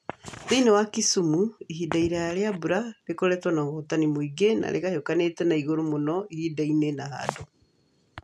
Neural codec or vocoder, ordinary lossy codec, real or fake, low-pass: none; none; real; none